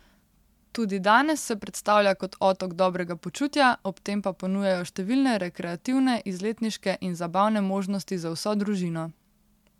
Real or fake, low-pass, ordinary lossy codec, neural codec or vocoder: real; 19.8 kHz; MP3, 96 kbps; none